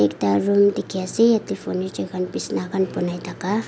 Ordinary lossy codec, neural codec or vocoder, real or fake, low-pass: none; none; real; none